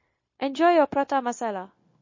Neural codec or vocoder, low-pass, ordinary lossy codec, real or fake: codec, 16 kHz, 0.9 kbps, LongCat-Audio-Codec; 7.2 kHz; MP3, 32 kbps; fake